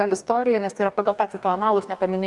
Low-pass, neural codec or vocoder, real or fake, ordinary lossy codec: 10.8 kHz; codec, 44.1 kHz, 2.6 kbps, DAC; fake; MP3, 96 kbps